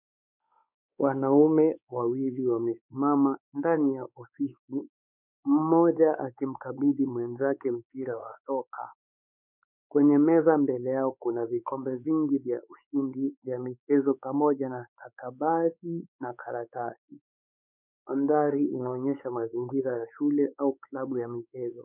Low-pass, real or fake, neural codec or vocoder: 3.6 kHz; fake; codec, 16 kHz, 4 kbps, X-Codec, WavLM features, trained on Multilingual LibriSpeech